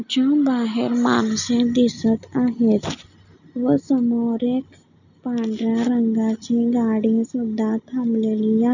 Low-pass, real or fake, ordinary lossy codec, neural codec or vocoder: 7.2 kHz; real; none; none